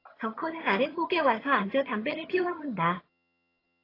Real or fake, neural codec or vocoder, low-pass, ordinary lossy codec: fake; vocoder, 22.05 kHz, 80 mel bands, HiFi-GAN; 5.4 kHz; AAC, 24 kbps